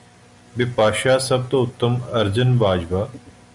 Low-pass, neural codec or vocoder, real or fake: 10.8 kHz; none; real